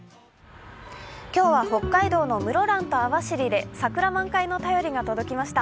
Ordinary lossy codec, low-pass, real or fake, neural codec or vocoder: none; none; real; none